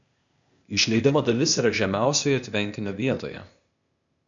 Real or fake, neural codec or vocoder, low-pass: fake; codec, 16 kHz, 0.8 kbps, ZipCodec; 7.2 kHz